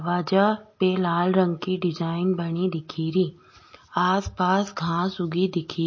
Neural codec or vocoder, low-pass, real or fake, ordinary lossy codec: none; 7.2 kHz; real; MP3, 32 kbps